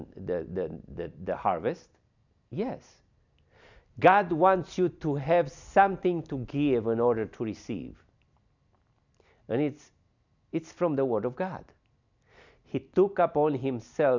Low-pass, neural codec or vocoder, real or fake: 7.2 kHz; none; real